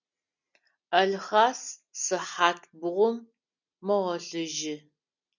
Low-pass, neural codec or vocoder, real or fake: 7.2 kHz; none; real